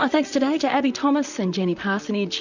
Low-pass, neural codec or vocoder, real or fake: 7.2 kHz; vocoder, 44.1 kHz, 128 mel bands, Pupu-Vocoder; fake